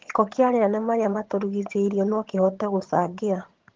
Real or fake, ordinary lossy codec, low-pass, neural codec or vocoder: fake; Opus, 16 kbps; 7.2 kHz; vocoder, 22.05 kHz, 80 mel bands, HiFi-GAN